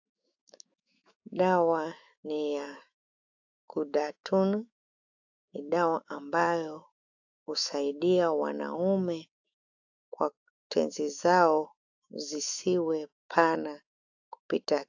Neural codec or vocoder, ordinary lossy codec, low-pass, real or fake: autoencoder, 48 kHz, 128 numbers a frame, DAC-VAE, trained on Japanese speech; AAC, 48 kbps; 7.2 kHz; fake